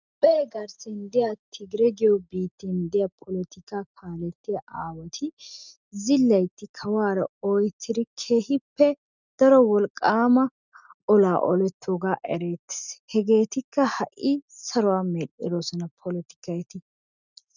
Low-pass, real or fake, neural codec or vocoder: 7.2 kHz; real; none